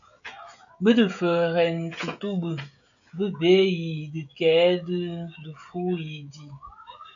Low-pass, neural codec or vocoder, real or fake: 7.2 kHz; codec, 16 kHz, 16 kbps, FreqCodec, smaller model; fake